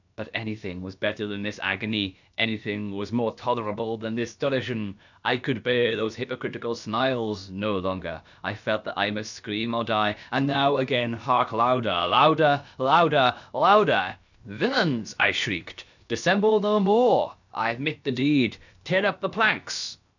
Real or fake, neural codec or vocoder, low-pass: fake; codec, 16 kHz, about 1 kbps, DyCAST, with the encoder's durations; 7.2 kHz